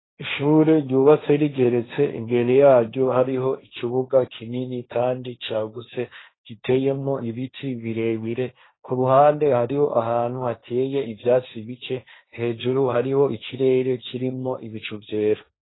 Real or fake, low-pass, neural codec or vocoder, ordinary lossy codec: fake; 7.2 kHz; codec, 16 kHz, 1.1 kbps, Voila-Tokenizer; AAC, 16 kbps